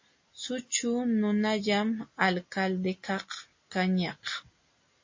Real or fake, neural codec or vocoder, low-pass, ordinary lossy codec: real; none; 7.2 kHz; MP3, 32 kbps